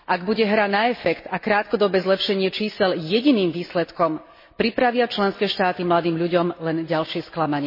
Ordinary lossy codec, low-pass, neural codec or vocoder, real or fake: MP3, 24 kbps; 5.4 kHz; none; real